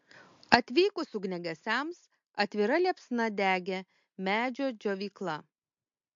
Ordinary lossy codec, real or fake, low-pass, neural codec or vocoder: MP3, 48 kbps; real; 7.2 kHz; none